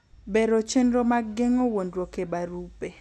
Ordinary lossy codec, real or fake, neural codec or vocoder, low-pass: none; real; none; none